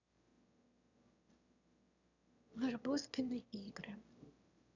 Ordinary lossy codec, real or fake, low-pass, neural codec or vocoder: none; fake; 7.2 kHz; autoencoder, 22.05 kHz, a latent of 192 numbers a frame, VITS, trained on one speaker